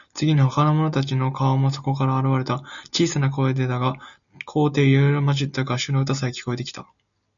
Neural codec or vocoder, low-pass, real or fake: none; 7.2 kHz; real